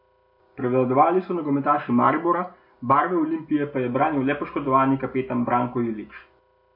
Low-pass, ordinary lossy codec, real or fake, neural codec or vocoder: 5.4 kHz; MP3, 32 kbps; real; none